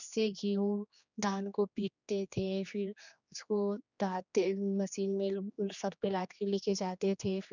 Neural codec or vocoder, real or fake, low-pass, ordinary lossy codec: codec, 16 kHz, 2 kbps, X-Codec, HuBERT features, trained on general audio; fake; 7.2 kHz; none